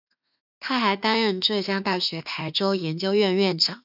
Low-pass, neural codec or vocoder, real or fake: 5.4 kHz; autoencoder, 48 kHz, 32 numbers a frame, DAC-VAE, trained on Japanese speech; fake